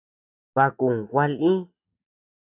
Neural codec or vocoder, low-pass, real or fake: none; 3.6 kHz; real